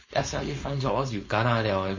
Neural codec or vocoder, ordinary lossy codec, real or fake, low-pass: codec, 16 kHz, 4.8 kbps, FACodec; MP3, 32 kbps; fake; 7.2 kHz